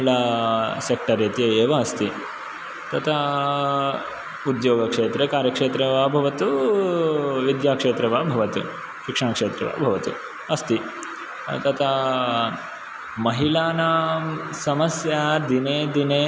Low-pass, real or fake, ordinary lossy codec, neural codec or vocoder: none; real; none; none